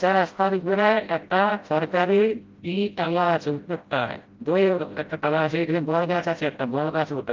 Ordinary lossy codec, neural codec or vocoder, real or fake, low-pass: Opus, 32 kbps; codec, 16 kHz, 0.5 kbps, FreqCodec, smaller model; fake; 7.2 kHz